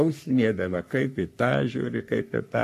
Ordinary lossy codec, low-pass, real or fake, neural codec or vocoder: AAC, 64 kbps; 14.4 kHz; fake; codec, 44.1 kHz, 3.4 kbps, Pupu-Codec